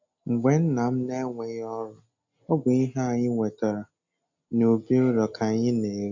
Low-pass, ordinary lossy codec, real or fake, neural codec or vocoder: 7.2 kHz; AAC, 48 kbps; real; none